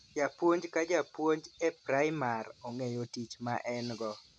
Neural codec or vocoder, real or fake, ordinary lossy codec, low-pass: none; real; none; none